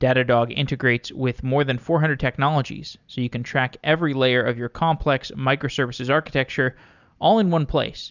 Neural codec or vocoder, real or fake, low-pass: none; real; 7.2 kHz